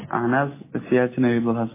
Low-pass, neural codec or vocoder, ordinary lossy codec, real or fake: 3.6 kHz; none; MP3, 16 kbps; real